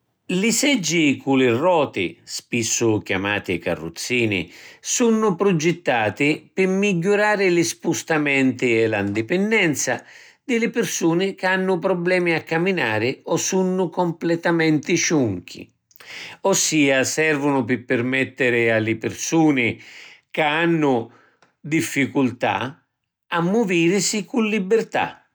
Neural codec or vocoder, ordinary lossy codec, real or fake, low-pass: none; none; real; none